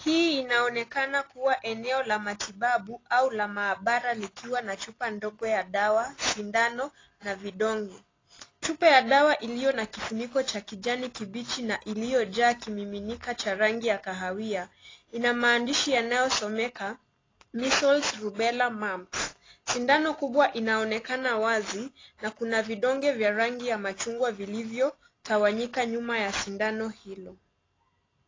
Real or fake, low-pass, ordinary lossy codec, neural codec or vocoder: real; 7.2 kHz; AAC, 32 kbps; none